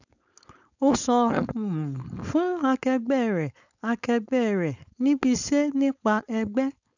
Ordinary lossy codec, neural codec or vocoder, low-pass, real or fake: none; codec, 16 kHz, 4.8 kbps, FACodec; 7.2 kHz; fake